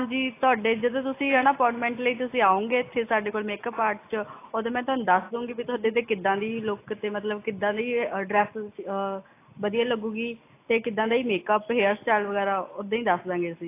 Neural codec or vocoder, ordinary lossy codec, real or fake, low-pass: none; AAC, 24 kbps; real; 3.6 kHz